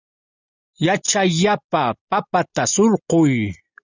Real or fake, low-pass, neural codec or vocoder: real; 7.2 kHz; none